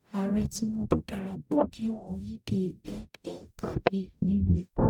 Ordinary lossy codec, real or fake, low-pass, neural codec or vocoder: none; fake; 19.8 kHz; codec, 44.1 kHz, 0.9 kbps, DAC